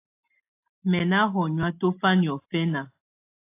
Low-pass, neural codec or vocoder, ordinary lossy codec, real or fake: 3.6 kHz; none; AAC, 24 kbps; real